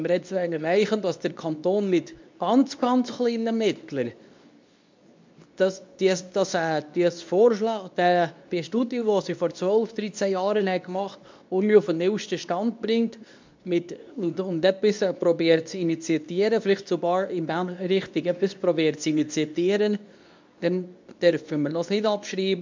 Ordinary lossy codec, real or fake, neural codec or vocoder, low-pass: MP3, 64 kbps; fake; codec, 24 kHz, 0.9 kbps, WavTokenizer, medium speech release version 2; 7.2 kHz